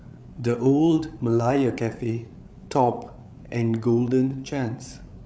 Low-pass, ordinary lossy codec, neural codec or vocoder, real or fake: none; none; codec, 16 kHz, 8 kbps, FreqCodec, larger model; fake